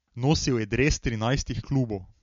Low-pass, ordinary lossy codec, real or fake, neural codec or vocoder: 7.2 kHz; MP3, 48 kbps; real; none